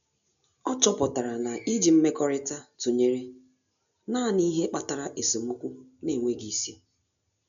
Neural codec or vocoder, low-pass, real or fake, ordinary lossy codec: none; 7.2 kHz; real; none